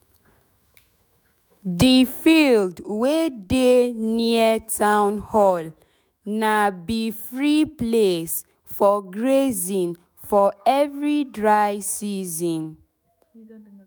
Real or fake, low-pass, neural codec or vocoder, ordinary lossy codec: fake; none; autoencoder, 48 kHz, 128 numbers a frame, DAC-VAE, trained on Japanese speech; none